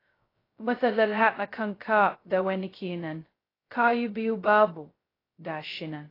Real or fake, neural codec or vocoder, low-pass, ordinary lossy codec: fake; codec, 16 kHz, 0.2 kbps, FocalCodec; 5.4 kHz; AAC, 24 kbps